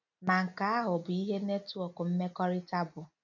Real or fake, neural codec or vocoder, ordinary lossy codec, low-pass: real; none; none; 7.2 kHz